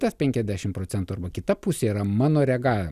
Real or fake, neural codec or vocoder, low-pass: real; none; 14.4 kHz